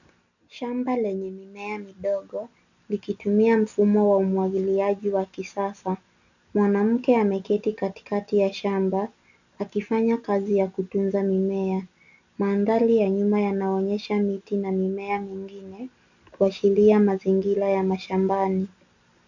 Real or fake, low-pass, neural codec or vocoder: real; 7.2 kHz; none